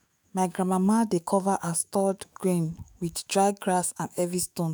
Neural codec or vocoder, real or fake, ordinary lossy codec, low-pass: autoencoder, 48 kHz, 128 numbers a frame, DAC-VAE, trained on Japanese speech; fake; none; none